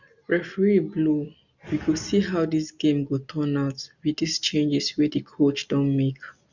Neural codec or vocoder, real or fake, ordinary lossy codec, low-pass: none; real; none; 7.2 kHz